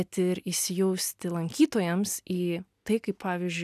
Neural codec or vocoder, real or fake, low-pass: none; real; 14.4 kHz